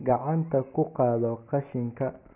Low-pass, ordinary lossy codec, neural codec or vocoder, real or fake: 3.6 kHz; none; none; real